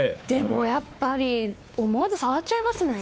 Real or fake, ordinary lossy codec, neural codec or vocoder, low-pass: fake; none; codec, 16 kHz, 2 kbps, X-Codec, WavLM features, trained on Multilingual LibriSpeech; none